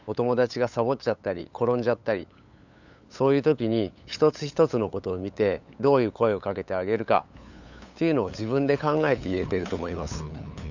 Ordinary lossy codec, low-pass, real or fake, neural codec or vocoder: none; 7.2 kHz; fake; codec, 16 kHz, 8 kbps, FunCodec, trained on LibriTTS, 25 frames a second